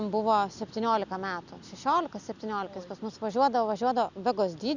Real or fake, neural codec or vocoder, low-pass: real; none; 7.2 kHz